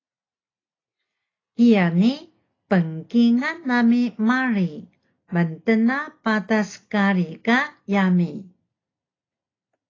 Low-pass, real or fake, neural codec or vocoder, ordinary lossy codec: 7.2 kHz; real; none; AAC, 32 kbps